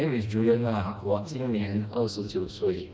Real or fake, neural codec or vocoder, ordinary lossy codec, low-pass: fake; codec, 16 kHz, 1 kbps, FreqCodec, smaller model; none; none